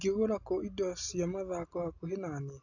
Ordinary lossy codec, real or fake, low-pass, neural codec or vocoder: AAC, 48 kbps; real; 7.2 kHz; none